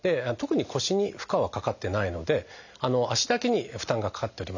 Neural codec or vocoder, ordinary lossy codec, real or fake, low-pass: none; none; real; 7.2 kHz